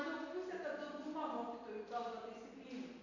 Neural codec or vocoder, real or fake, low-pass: none; real; 7.2 kHz